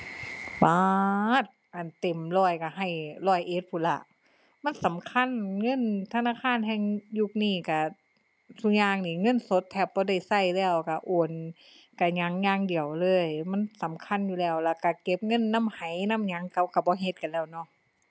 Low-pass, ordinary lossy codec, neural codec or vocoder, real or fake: none; none; none; real